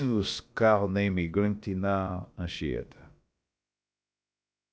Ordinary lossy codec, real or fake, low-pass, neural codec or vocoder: none; fake; none; codec, 16 kHz, about 1 kbps, DyCAST, with the encoder's durations